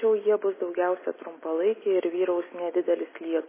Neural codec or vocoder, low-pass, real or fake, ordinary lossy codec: none; 3.6 kHz; real; MP3, 16 kbps